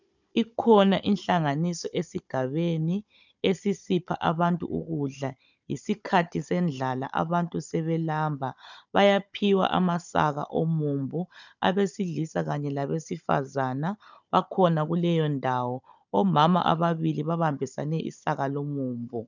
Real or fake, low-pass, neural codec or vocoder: fake; 7.2 kHz; codec, 16 kHz, 16 kbps, FunCodec, trained on Chinese and English, 50 frames a second